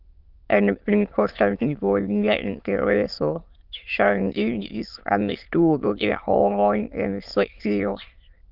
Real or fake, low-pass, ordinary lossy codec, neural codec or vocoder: fake; 5.4 kHz; Opus, 32 kbps; autoencoder, 22.05 kHz, a latent of 192 numbers a frame, VITS, trained on many speakers